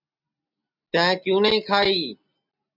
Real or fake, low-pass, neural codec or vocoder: real; 5.4 kHz; none